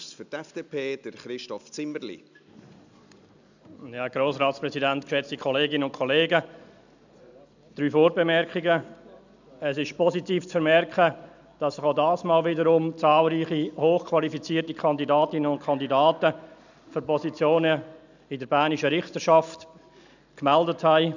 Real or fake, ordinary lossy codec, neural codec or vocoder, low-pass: real; none; none; 7.2 kHz